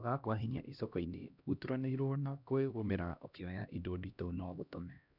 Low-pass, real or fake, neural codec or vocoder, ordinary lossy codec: 5.4 kHz; fake; codec, 16 kHz, 1 kbps, X-Codec, HuBERT features, trained on LibriSpeech; none